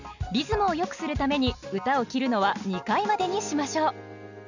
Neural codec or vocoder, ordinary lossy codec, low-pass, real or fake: none; none; 7.2 kHz; real